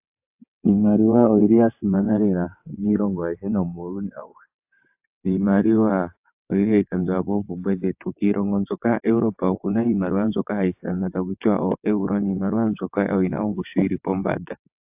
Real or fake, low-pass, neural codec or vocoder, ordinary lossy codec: fake; 3.6 kHz; vocoder, 22.05 kHz, 80 mel bands, WaveNeXt; AAC, 32 kbps